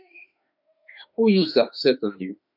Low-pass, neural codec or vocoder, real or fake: 5.4 kHz; autoencoder, 48 kHz, 32 numbers a frame, DAC-VAE, trained on Japanese speech; fake